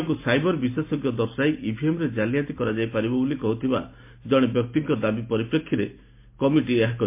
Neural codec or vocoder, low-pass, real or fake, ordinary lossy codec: none; 3.6 kHz; real; MP3, 24 kbps